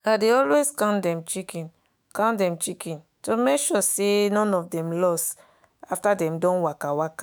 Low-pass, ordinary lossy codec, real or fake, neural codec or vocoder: none; none; fake; autoencoder, 48 kHz, 128 numbers a frame, DAC-VAE, trained on Japanese speech